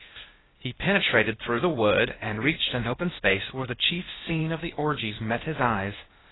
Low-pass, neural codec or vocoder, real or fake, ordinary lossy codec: 7.2 kHz; codec, 16 kHz, 0.8 kbps, ZipCodec; fake; AAC, 16 kbps